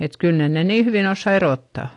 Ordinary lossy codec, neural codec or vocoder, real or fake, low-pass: AAC, 48 kbps; none; real; 10.8 kHz